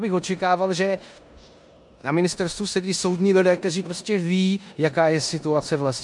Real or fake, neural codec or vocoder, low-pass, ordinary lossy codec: fake; codec, 16 kHz in and 24 kHz out, 0.9 kbps, LongCat-Audio-Codec, four codebook decoder; 10.8 kHz; MP3, 64 kbps